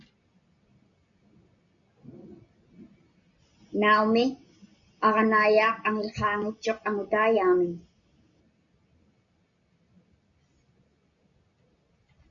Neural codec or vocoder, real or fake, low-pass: none; real; 7.2 kHz